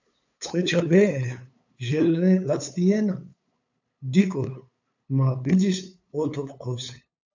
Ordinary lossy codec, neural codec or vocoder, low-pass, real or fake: AAC, 48 kbps; codec, 16 kHz, 8 kbps, FunCodec, trained on LibriTTS, 25 frames a second; 7.2 kHz; fake